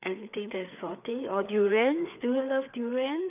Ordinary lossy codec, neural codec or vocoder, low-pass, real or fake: none; codec, 16 kHz, 4 kbps, FreqCodec, larger model; 3.6 kHz; fake